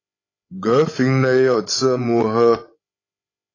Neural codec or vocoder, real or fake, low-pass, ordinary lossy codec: codec, 16 kHz, 16 kbps, FreqCodec, larger model; fake; 7.2 kHz; AAC, 32 kbps